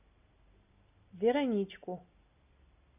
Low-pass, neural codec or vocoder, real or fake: 3.6 kHz; none; real